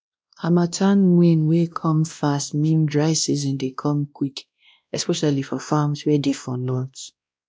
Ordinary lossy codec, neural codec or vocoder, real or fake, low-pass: none; codec, 16 kHz, 1 kbps, X-Codec, WavLM features, trained on Multilingual LibriSpeech; fake; none